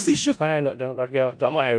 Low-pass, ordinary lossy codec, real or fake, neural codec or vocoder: 9.9 kHz; AAC, 64 kbps; fake; codec, 16 kHz in and 24 kHz out, 0.4 kbps, LongCat-Audio-Codec, four codebook decoder